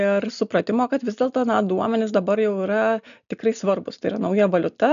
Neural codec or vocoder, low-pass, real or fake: none; 7.2 kHz; real